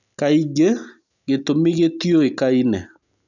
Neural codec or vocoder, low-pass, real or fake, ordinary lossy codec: none; 7.2 kHz; real; none